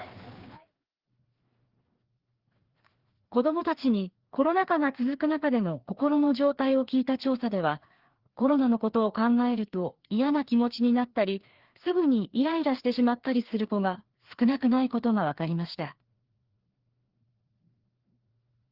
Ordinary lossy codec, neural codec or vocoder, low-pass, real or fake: Opus, 16 kbps; codec, 16 kHz, 2 kbps, FreqCodec, larger model; 5.4 kHz; fake